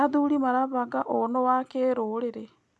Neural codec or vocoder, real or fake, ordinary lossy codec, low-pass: none; real; none; none